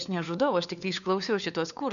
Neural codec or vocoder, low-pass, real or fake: codec, 16 kHz, 4 kbps, FunCodec, trained on LibriTTS, 50 frames a second; 7.2 kHz; fake